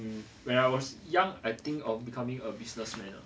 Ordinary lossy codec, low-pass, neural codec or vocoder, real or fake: none; none; none; real